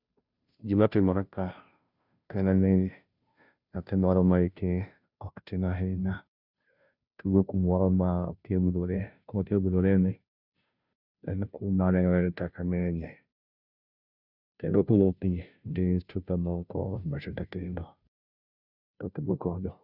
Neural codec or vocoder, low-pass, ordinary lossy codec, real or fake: codec, 16 kHz, 0.5 kbps, FunCodec, trained on Chinese and English, 25 frames a second; 5.4 kHz; none; fake